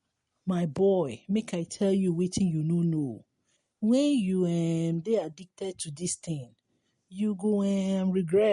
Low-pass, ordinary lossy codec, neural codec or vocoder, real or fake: 19.8 kHz; MP3, 48 kbps; none; real